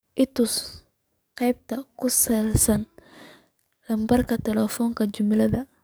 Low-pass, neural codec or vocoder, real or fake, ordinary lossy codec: none; codec, 44.1 kHz, 7.8 kbps, DAC; fake; none